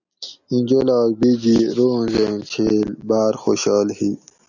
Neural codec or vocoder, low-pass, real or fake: none; 7.2 kHz; real